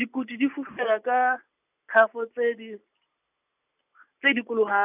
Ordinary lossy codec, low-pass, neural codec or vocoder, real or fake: none; 3.6 kHz; none; real